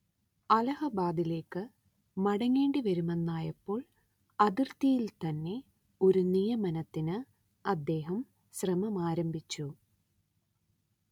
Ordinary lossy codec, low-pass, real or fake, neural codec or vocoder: none; 19.8 kHz; real; none